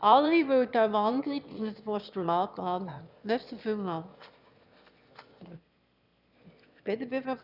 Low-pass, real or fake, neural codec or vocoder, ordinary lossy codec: 5.4 kHz; fake; autoencoder, 22.05 kHz, a latent of 192 numbers a frame, VITS, trained on one speaker; none